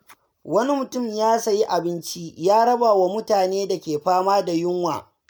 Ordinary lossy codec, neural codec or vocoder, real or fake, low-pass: none; none; real; none